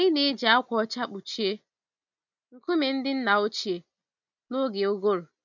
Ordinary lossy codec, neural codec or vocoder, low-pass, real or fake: none; none; 7.2 kHz; real